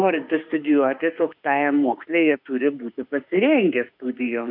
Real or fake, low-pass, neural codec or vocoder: fake; 5.4 kHz; autoencoder, 48 kHz, 32 numbers a frame, DAC-VAE, trained on Japanese speech